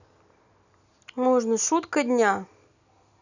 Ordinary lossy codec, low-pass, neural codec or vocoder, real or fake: none; 7.2 kHz; none; real